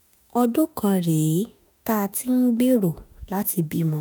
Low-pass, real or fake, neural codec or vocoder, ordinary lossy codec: none; fake; autoencoder, 48 kHz, 32 numbers a frame, DAC-VAE, trained on Japanese speech; none